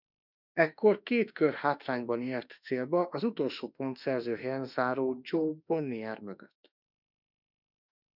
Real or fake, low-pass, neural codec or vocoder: fake; 5.4 kHz; autoencoder, 48 kHz, 32 numbers a frame, DAC-VAE, trained on Japanese speech